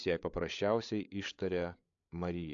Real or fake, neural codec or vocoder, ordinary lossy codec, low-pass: fake; codec, 16 kHz, 8 kbps, FreqCodec, larger model; AAC, 64 kbps; 7.2 kHz